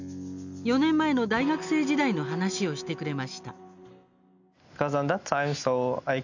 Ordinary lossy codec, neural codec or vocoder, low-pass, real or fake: none; none; 7.2 kHz; real